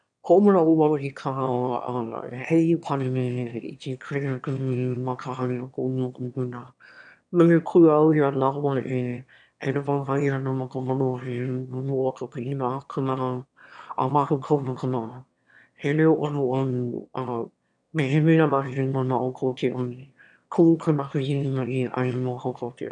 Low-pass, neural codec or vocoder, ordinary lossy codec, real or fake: 9.9 kHz; autoencoder, 22.05 kHz, a latent of 192 numbers a frame, VITS, trained on one speaker; none; fake